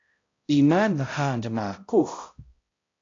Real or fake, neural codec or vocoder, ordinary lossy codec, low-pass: fake; codec, 16 kHz, 0.5 kbps, X-Codec, HuBERT features, trained on balanced general audio; AAC, 32 kbps; 7.2 kHz